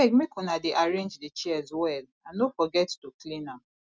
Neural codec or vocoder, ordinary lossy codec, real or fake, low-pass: none; none; real; none